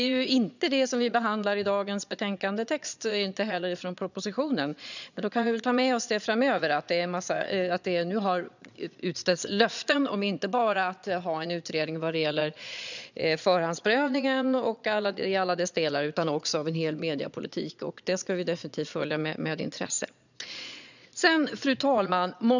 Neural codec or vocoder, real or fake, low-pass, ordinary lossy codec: vocoder, 22.05 kHz, 80 mel bands, Vocos; fake; 7.2 kHz; none